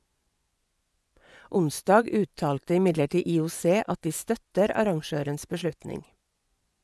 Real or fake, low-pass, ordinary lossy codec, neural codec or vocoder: fake; none; none; vocoder, 24 kHz, 100 mel bands, Vocos